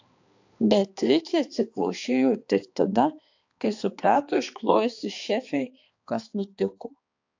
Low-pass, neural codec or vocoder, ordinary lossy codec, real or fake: 7.2 kHz; codec, 16 kHz, 2 kbps, X-Codec, HuBERT features, trained on balanced general audio; AAC, 48 kbps; fake